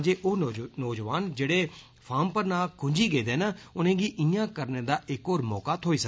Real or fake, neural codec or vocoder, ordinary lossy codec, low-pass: real; none; none; none